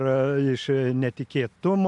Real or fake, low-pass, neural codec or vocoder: real; 10.8 kHz; none